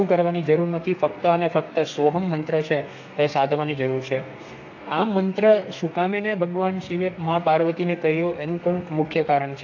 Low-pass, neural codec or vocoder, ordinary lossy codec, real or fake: 7.2 kHz; codec, 32 kHz, 1.9 kbps, SNAC; AAC, 48 kbps; fake